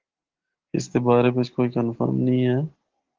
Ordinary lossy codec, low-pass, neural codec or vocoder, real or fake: Opus, 16 kbps; 7.2 kHz; none; real